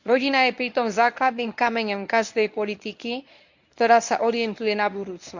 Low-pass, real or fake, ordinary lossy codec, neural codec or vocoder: 7.2 kHz; fake; none; codec, 24 kHz, 0.9 kbps, WavTokenizer, medium speech release version 1